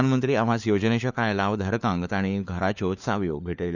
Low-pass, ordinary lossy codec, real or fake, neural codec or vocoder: 7.2 kHz; none; fake; codec, 16 kHz, 4 kbps, FunCodec, trained on LibriTTS, 50 frames a second